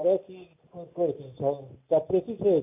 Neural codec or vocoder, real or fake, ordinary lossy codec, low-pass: none; real; AAC, 24 kbps; 3.6 kHz